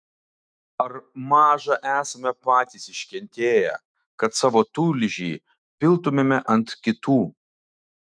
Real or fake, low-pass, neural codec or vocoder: fake; 9.9 kHz; autoencoder, 48 kHz, 128 numbers a frame, DAC-VAE, trained on Japanese speech